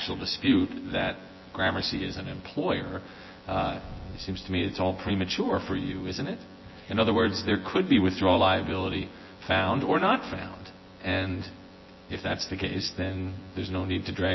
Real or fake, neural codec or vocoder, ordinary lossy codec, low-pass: fake; vocoder, 24 kHz, 100 mel bands, Vocos; MP3, 24 kbps; 7.2 kHz